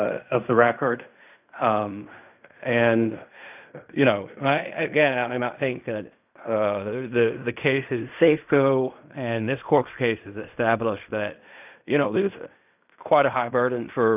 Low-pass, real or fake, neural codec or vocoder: 3.6 kHz; fake; codec, 16 kHz in and 24 kHz out, 0.4 kbps, LongCat-Audio-Codec, fine tuned four codebook decoder